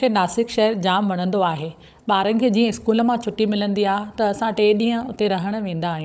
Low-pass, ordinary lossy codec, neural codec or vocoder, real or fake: none; none; codec, 16 kHz, 16 kbps, FunCodec, trained on Chinese and English, 50 frames a second; fake